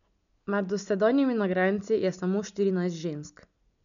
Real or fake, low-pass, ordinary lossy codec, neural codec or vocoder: real; 7.2 kHz; none; none